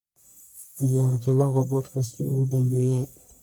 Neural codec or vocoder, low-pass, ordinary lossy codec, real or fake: codec, 44.1 kHz, 1.7 kbps, Pupu-Codec; none; none; fake